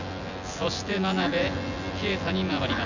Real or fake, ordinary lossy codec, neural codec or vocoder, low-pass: fake; none; vocoder, 24 kHz, 100 mel bands, Vocos; 7.2 kHz